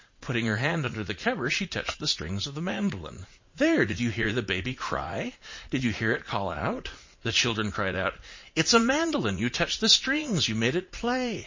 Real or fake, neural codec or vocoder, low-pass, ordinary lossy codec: fake; vocoder, 44.1 kHz, 80 mel bands, Vocos; 7.2 kHz; MP3, 32 kbps